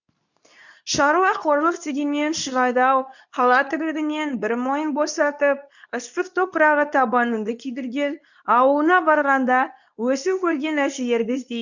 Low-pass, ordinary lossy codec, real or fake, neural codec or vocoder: 7.2 kHz; none; fake; codec, 24 kHz, 0.9 kbps, WavTokenizer, medium speech release version 2